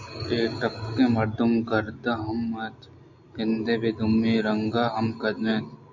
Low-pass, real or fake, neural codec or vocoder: 7.2 kHz; real; none